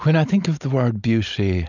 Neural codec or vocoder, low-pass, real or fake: none; 7.2 kHz; real